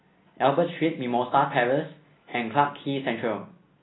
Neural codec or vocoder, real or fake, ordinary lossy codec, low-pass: none; real; AAC, 16 kbps; 7.2 kHz